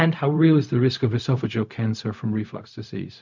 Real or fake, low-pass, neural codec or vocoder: fake; 7.2 kHz; codec, 16 kHz, 0.4 kbps, LongCat-Audio-Codec